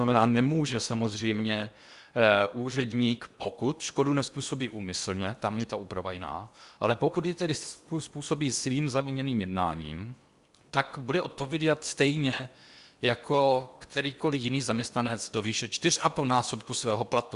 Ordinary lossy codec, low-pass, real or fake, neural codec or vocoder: Opus, 64 kbps; 10.8 kHz; fake; codec, 16 kHz in and 24 kHz out, 0.8 kbps, FocalCodec, streaming, 65536 codes